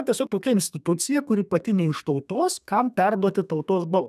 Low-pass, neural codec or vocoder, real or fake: 14.4 kHz; codec, 32 kHz, 1.9 kbps, SNAC; fake